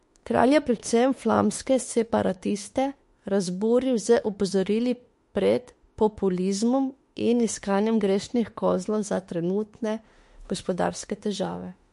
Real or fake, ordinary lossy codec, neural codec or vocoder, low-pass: fake; MP3, 48 kbps; autoencoder, 48 kHz, 32 numbers a frame, DAC-VAE, trained on Japanese speech; 14.4 kHz